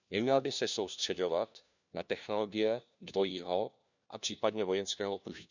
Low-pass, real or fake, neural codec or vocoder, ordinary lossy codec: 7.2 kHz; fake; codec, 16 kHz, 1 kbps, FunCodec, trained on LibriTTS, 50 frames a second; none